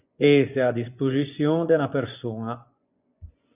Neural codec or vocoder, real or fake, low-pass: vocoder, 24 kHz, 100 mel bands, Vocos; fake; 3.6 kHz